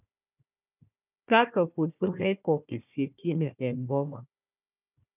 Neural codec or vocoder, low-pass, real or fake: codec, 16 kHz, 1 kbps, FunCodec, trained on Chinese and English, 50 frames a second; 3.6 kHz; fake